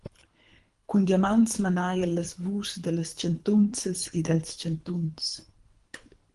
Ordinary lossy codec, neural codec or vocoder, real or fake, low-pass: Opus, 24 kbps; codec, 24 kHz, 3 kbps, HILCodec; fake; 10.8 kHz